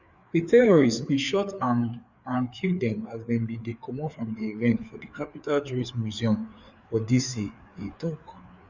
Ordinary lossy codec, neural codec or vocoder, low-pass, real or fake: none; codec, 16 kHz, 4 kbps, FreqCodec, larger model; 7.2 kHz; fake